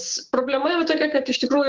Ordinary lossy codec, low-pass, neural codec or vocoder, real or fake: Opus, 16 kbps; 7.2 kHz; codec, 16 kHz, 6 kbps, DAC; fake